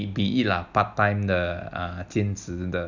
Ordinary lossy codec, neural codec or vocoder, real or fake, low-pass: none; none; real; 7.2 kHz